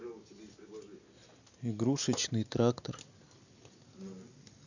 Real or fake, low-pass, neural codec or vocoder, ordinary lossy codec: real; 7.2 kHz; none; none